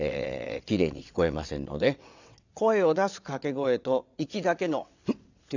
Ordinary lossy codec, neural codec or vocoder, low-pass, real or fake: none; vocoder, 22.05 kHz, 80 mel bands, WaveNeXt; 7.2 kHz; fake